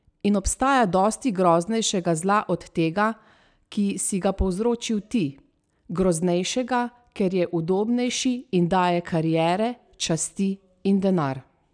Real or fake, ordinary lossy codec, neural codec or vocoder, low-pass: real; none; none; 9.9 kHz